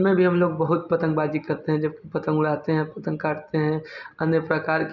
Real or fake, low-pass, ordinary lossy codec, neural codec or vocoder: real; 7.2 kHz; none; none